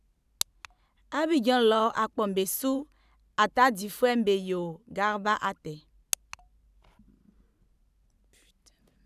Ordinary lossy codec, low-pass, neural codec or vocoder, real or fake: none; 14.4 kHz; vocoder, 44.1 kHz, 128 mel bands every 512 samples, BigVGAN v2; fake